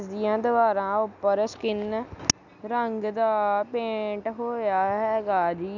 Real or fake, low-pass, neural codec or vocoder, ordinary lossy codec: real; 7.2 kHz; none; none